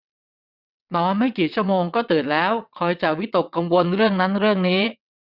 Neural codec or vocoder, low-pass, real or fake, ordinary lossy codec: vocoder, 22.05 kHz, 80 mel bands, WaveNeXt; 5.4 kHz; fake; none